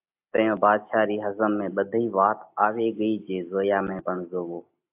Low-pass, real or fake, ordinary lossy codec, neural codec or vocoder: 3.6 kHz; real; AAC, 32 kbps; none